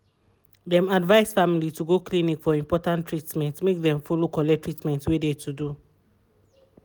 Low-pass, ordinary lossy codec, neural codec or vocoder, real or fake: none; none; none; real